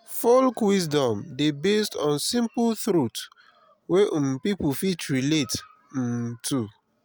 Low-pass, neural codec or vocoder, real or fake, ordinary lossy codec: none; none; real; none